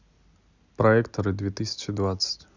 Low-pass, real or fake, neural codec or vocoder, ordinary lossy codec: 7.2 kHz; real; none; Opus, 64 kbps